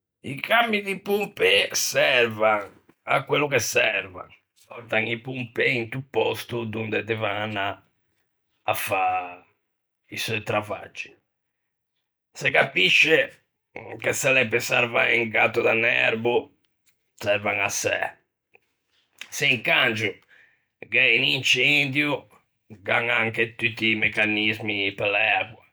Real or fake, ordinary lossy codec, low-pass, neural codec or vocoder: real; none; none; none